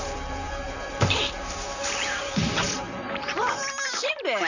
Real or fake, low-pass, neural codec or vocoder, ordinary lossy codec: fake; 7.2 kHz; vocoder, 44.1 kHz, 128 mel bands, Pupu-Vocoder; none